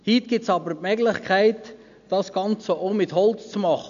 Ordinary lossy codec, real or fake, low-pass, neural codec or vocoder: MP3, 96 kbps; real; 7.2 kHz; none